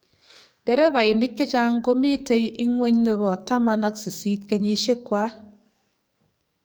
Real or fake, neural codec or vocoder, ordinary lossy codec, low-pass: fake; codec, 44.1 kHz, 2.6 kbps, SNAC; none; none